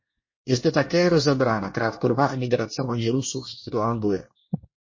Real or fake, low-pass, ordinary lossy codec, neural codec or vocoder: fake; 7.2 kHz; MP3, 32 kbps; codec, 24 kHz, 1 kbps, SNAC